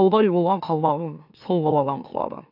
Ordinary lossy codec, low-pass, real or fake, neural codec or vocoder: AAC, 48 kbps; 5.4 kHz; fake; autoencoder, 44.1 kHz, a latent of 192 numbers a frame, MeloTTS